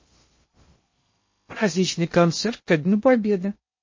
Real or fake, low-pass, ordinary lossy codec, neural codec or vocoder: fake; 7.2 kHz; MP3, 32 kbps; codec, 16 kHz in and 24 kHz out, 0.6 kbps, FocalCodec, streaming, 2048 codes